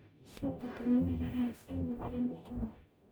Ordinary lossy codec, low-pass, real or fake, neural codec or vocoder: none; none; fake; codec, 44.1 kHz, 0.9 kbps, DAC